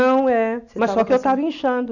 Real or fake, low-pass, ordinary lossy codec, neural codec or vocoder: real; 7.2 kHz; none; none